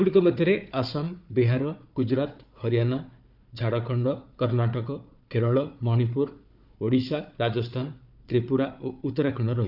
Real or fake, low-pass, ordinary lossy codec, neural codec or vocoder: fake; 5.4 kHz; none; codec, 16 kHz, 4 kbps, FunCodec, trained on Chinese and English, 50 frames a second